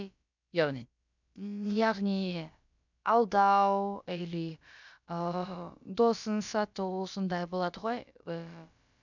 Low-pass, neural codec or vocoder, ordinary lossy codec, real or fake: 7.2 kHz; codec, 16 kHz, about 1 kbps, DyCAST, with the encoder's durations; none; fake